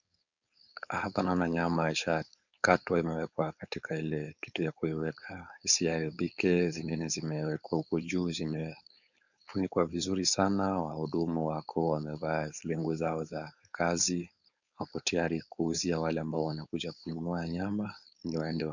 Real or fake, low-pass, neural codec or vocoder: fake; 7.2 kHz; codec, 16 kHz, 4.8 kbps, FACodec